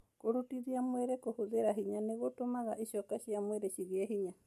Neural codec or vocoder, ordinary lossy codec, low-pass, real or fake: none; MP3, 64 kbps; 14.4 kHz; real